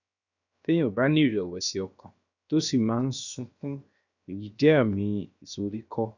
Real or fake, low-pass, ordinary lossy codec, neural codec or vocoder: fake; 7.2 kHz; none; codec, 16 kHz, 0.7 kbps, FocalCodec